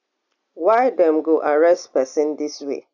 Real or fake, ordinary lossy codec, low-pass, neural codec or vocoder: real; none; 7.2 kHz; none